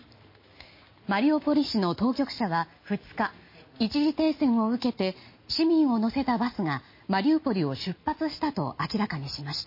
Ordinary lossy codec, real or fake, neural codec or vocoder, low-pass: MP3, 24 kbps; real; none; 5.4 kHz